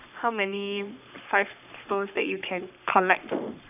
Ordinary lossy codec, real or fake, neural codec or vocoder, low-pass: none; fake; codec, 44.1 kHz, 3.4 kbps, Pupu-Codec; 3.6 kHz